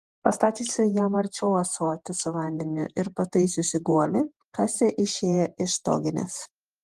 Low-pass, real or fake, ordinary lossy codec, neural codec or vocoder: 14.4 kHz; fake; Opus, 24 kbps; vocoder, 48 kHz, 128 mel bands, Vocos